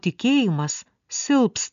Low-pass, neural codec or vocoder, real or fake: 7.2 kHz; none; real